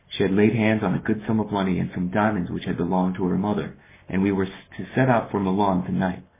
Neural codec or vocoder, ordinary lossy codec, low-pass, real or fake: codec, 44.1 kHz, 7.8 kbps, Pupu-Codec; MP3, 16 kbps; 3.6 kHz; fake